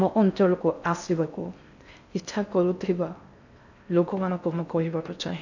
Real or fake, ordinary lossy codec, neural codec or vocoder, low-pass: fake; none; codec, 16 kHz in and 24 kHz out, 0.6 kbps, FocalCodec, streaming, 4096 codes; 7.2 kHz